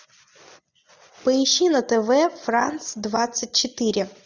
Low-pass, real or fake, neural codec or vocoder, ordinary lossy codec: 7.2 kHz; real; none; Opus, 64 kbps